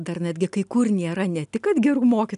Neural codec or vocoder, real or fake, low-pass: none; real; 10.8 kHz